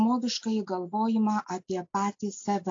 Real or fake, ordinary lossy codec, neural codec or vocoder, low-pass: real; AAC, 48 kbps; none; 7.2 kHz